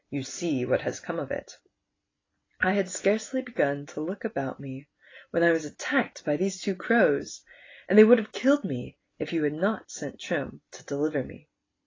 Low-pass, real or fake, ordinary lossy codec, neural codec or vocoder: 7.2 kHz; real; AAC, 32 kbps; none